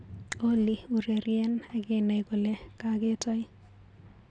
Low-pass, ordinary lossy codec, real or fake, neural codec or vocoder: 9.9 kHz; none; real; none